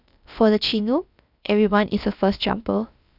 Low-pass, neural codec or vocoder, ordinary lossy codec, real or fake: 5.4 kHz; codec, 16 kHz, about 1 kbps, DyCAST, with the encoder's durations; none; fake